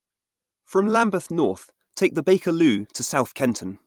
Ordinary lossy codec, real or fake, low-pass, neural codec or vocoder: Opus, 32 kbps; fake; 19.8 kHz; vocoder, 44.1 kHz, 128 mel bands every 256 samples, BigVGAN v2